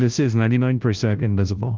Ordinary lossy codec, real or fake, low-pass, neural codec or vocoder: Opus, 24 kbps; fake; 7.2 kHz; codec, 16 kHz, 0.5 kbps, FunCodec, trained on Chinese and English, 25 frames a second